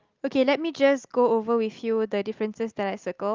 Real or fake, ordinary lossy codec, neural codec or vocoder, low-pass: real; Opus, 32 kbps; none; 7.2 kHz